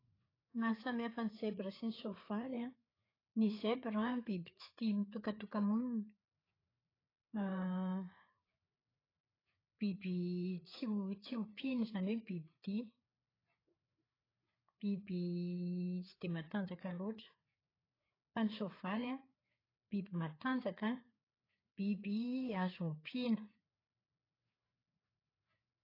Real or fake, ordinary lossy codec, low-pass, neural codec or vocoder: fake; AAC, 24 kbps; 5.4 kHz; codec, 16 kHz, 4 kbps, FreqCodec, larger model